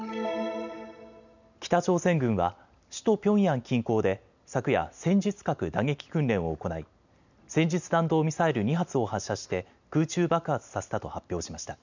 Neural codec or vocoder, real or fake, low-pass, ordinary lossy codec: none; real; 7.2 kHz; none